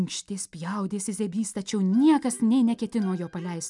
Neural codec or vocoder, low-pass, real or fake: none; 10.8 kHz; real